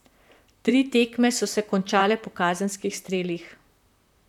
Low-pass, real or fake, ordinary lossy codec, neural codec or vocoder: 19.8 kHz; fake; none; vocoder, 44.1 kHz, 128 mel bands, Pupu-Vocoder